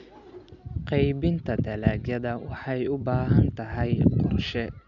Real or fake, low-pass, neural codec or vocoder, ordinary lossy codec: real; 7.2 kHz; none; none